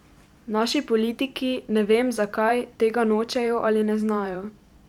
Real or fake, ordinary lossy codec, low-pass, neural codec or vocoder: fake; none; 19.8 kHz; vocoder, 44.1 kHz, 128 mel bands every 512 samples, BigVGAN v2